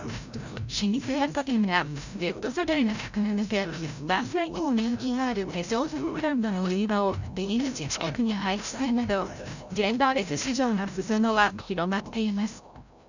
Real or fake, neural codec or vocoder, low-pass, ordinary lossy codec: fake; codec, 16 kHz, 0.5 kbps, FreqCodec, larger model; 7.2 kHz; none